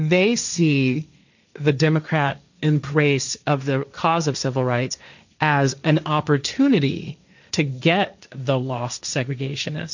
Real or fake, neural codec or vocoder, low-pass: fake; codec, 16 kHz, 1.1 kbps, Voila-Tokenizer; 7.2 kHz